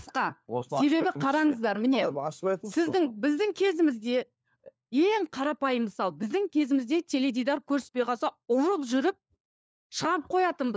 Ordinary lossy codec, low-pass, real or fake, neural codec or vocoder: none; none; fake; codec, 16 kHz, 4 kbps, FunCodec, trained on LibriTTS, 50 frames a second